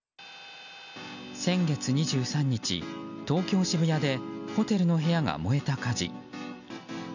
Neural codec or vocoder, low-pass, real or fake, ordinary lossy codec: none; 7.2 kHz; real; none